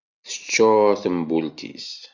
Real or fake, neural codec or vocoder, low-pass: real; none; 7.2 kHz